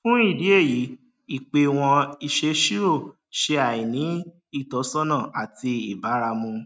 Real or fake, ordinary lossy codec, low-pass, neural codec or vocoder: real; none; none; none